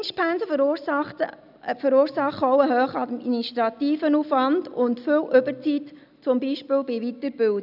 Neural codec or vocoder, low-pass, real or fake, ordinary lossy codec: none; 5.4 kHz; real; none